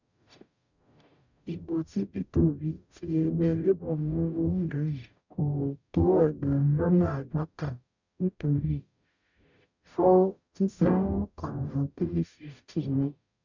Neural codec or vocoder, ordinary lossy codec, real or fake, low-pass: codec, 44.1 kHz, 0.9 kbps, DAC; none; fake; 7.2 kHz